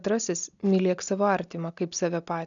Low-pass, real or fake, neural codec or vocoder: 7.2 kHz; real; none